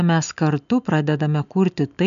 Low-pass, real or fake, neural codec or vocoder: 7.2 kHz; real; none